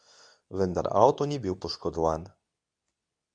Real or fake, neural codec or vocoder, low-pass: fake; codec, 24 kHz, 0.9 kbps, WavTokenizer, medium speech release version 2; 9.9 kHz